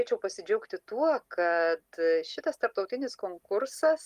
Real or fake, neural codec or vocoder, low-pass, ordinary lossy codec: real; none; 14.4 kHz; Opus, 24 kbps